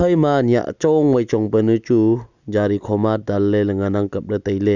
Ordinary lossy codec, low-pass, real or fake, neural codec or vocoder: none; 7.2 kHz; real; none